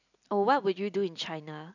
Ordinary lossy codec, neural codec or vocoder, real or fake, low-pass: none; none; real; 7.2 kHz